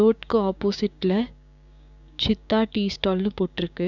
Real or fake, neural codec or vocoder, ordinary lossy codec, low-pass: fake; codec, 16 kHz, 6 kbps, DAC; none; 7.2 kHz